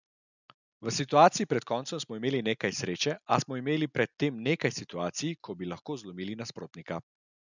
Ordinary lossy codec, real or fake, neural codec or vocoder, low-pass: none; real; none; 7.2 kHz